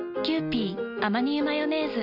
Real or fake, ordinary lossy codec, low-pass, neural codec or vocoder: real; none; 5.4 kHz; none